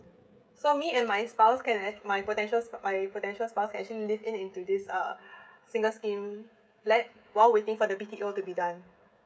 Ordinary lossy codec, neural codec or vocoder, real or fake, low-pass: none; codec, 16 kHz, 16 kbps, FreqCodec, smaller model; fake; none